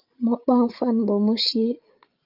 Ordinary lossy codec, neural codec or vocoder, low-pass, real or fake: Opus, 24 kbps; codec, 16 kHz, 16 kbps, FreqCodec, larger model; 5.4 kHz; fake